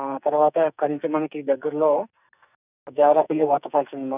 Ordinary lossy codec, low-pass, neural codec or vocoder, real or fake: none; 3.6 kHz; codec, 44.1 kHz, 2.6 kbps, SNAC; fake